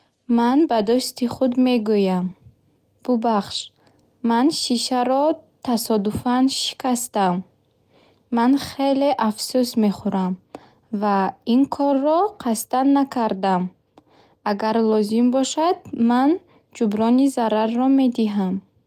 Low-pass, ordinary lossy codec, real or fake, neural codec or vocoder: 14.4 kHz; Opus, 32 kbps; real; none